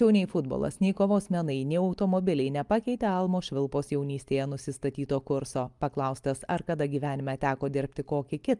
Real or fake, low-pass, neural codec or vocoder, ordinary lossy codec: real; 10.8 kHz; none; Opus, 32 kbps